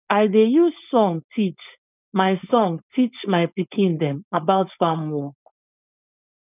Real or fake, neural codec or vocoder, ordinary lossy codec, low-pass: fake; codec, 16 kHz, 4.8 kbps, FACodec; none; 3.6 kHz